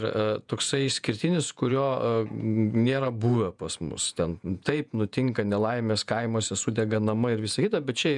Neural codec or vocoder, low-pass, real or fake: vocoder, 48 kHz, 128 mel bands, Vocos; 10.8 kHz; fake